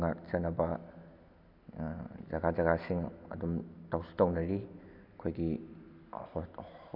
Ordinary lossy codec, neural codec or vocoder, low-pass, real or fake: AAC, 48 kbps; none; 5.4 kHz; real